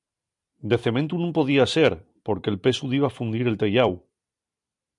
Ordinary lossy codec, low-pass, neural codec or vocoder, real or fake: MP3, 96 kbps; 10.8 kHz; vocoder, 44.1 kHz, 128 mel bands every 256 samples, BigVGAN v2; fake